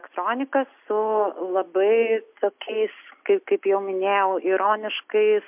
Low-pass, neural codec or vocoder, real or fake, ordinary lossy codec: 3.6 kHz; none; real; MP3, 32 kbps